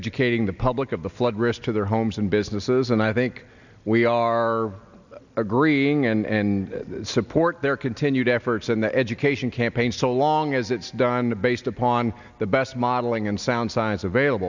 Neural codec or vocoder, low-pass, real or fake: none; 7.2 kHz; real